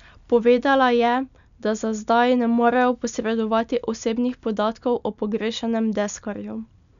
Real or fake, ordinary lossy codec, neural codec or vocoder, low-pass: real; none; none; 7.2 kHz